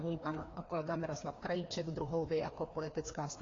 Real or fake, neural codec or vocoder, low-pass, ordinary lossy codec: fake; codec, 16 kHz, 2 kbps, FreqCodec, larger model; 7.2 kHz; AAC, 32 kbps